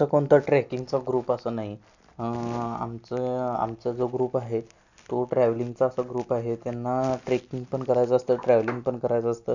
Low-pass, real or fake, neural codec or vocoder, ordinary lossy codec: 7.2 kHz; real; none; none